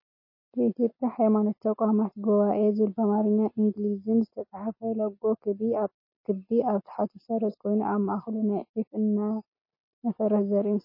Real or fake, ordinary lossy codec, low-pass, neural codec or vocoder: real; MP3, 24 kbps; 5.4 kHz; none